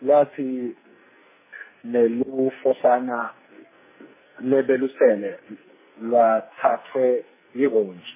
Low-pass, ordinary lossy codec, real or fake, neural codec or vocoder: 3.6 kHz; MP3, 16 kbps; fake; codec, 44.1 kHz, 2.6 kbps, SNAC